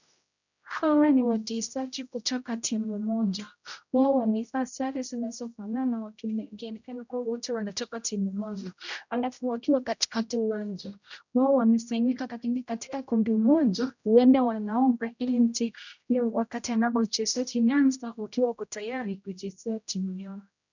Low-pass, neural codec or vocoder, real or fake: 7.2 kHz; codec, 16 kHz, 0.5 kbps, X-Codec, HuBERT features, trained on general audio; fake